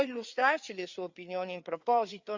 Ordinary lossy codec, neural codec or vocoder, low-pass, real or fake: Opus, 64 kbps; codec, 16 kHz, 4 kbps, FreqCodec, larger model; 7.2 kHz; fake